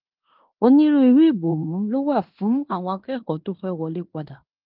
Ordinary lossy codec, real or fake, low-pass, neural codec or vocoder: Opus, 32 kbps; fake; 5.4 kHz; codec, 16 kHz in and 24 kHz out, 0.9 kbps, LongCat-Audio-Codec, fine tuned four codebook decoder